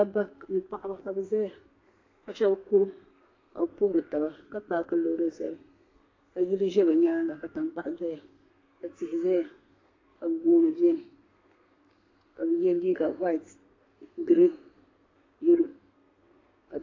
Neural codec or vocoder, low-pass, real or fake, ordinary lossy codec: codec, 32 kHz, 1.9 kbps, SNAC; 7.2 kHz; fake; AAC, 32 kbps